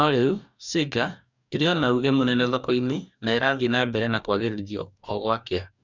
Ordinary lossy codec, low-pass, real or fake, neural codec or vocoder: none; 7.2 kHz; fake; codec, 44.1 kHz, 2.6 kbps, DAC